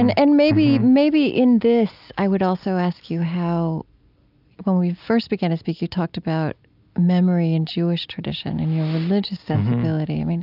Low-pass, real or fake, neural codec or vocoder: 5.4 kHz; real; none